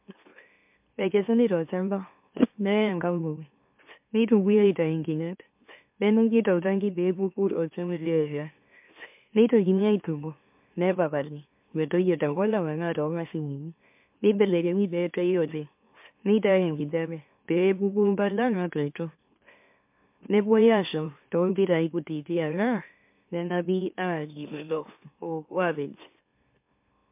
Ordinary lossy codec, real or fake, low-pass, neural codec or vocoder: MP3, 32 kbps; fake; 3.6 kHz; autoencoder, 44.1 kHz, a latent of 192 numbers a frame, MeloTTS